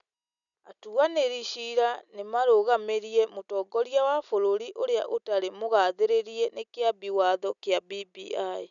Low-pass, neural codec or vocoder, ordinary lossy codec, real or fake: 7.2 kHz; none; none; real